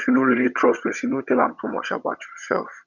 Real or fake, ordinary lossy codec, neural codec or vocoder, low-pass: fake; none; vocoder, 22.05 kHz, 80 mel bands, HiFi-GAN; 7.2 kHz